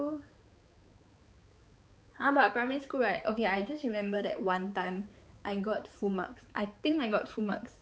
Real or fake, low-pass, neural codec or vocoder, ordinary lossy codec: fake; none; codec, 16 kHz, 4 kbps, X-Codec, HuBERT features, trained on balanced general audio; none